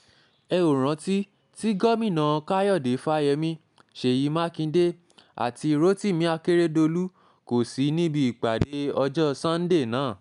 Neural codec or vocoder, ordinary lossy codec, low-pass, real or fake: none; none; 10.8 kHz; real